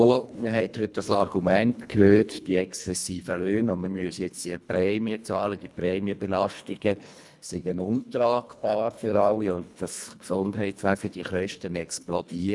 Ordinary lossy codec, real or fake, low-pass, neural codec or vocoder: none; fake; none; codec, 24 kHz, 1.5 kbps, HILCodec